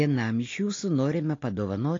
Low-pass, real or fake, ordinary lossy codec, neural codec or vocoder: 7.2 kHz; real; AAC, 32 kbps; none